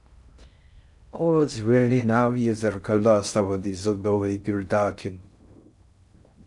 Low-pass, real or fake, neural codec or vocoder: 10.8 kHz; fake; codec, 16 kHz in and 24 kHz out, 0.6 kbps, FocalCodec, streaming, 2048 codes